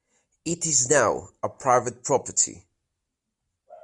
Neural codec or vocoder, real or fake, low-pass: none; real; 10.8 kHz